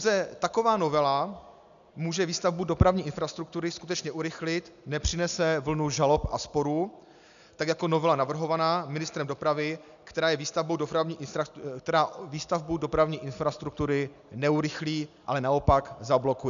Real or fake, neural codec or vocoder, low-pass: real; none; 7.2 kHz